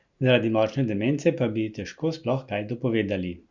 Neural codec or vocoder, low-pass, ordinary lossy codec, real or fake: none; 7.2 kHz; Opus, 64 kbps; real